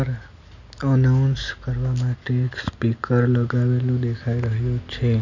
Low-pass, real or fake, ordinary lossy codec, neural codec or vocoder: 7.2 kHz; real; none; none